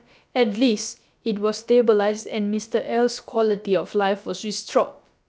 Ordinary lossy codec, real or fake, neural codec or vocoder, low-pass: none; fake; codec, 16 kHz, about 1 kbps, DyCAST, with the encoder's durations; none